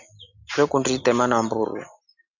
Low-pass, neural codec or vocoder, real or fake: 7.2 kHz; none; real